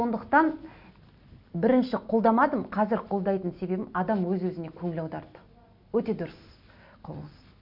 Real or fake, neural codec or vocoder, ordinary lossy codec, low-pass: real; none; none; 5.4 kHz